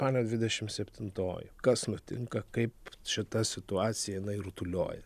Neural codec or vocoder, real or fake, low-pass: none; real; 14.4 kHz